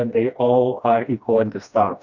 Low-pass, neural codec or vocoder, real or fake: 7.2 kHz; codec, 16 kHz, 1 kbps, FreqCodec, smaller model; fake